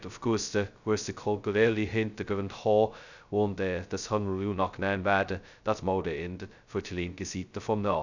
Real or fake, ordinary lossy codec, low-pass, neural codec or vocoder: fake; none; 7.2 kHz; codec, 16 kHz, 0.2 kbps, FocalCodec